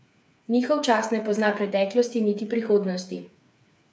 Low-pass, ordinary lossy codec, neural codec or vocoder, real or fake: none; none; codec, 16 kHz, 8 kbps, FreqCodec, smaller model; fake